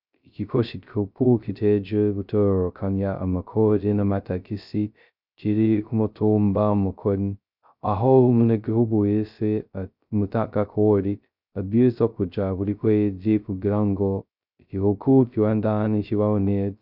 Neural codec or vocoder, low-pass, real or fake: codec, 16 kHz, 0.2 kbps, FocalCodec; 5.4 kHz; fake